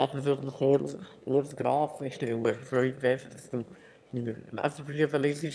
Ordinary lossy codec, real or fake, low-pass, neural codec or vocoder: none; fake; none; autoencoder, 22.05 kHz, a latent of 192 numbers a frame, VITS, trained on one speaker